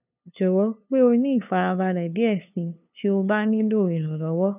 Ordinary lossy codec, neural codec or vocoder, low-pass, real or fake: none; codec, 16 kHz, 2 kbps, FunCodec, trained on LibriTTS, 25 frames a second; 3.6 kHz; fake